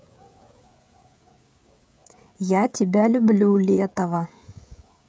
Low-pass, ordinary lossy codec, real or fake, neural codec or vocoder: none; none; fake; codec, 16 kHz, 16 kbps, FreqCodec, smaller model